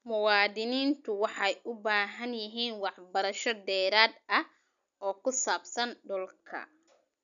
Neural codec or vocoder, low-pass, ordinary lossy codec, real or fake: none; 7.2 kHz; none; real